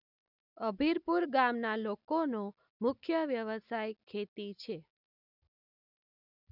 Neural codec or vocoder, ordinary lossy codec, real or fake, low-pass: none; none; real; 5.4 kHz